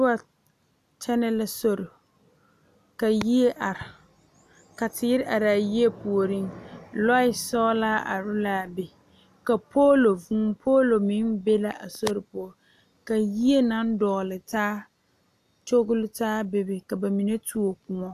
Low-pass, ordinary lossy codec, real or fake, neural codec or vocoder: 14.4 kHz; AAC, 96 kbps; real; none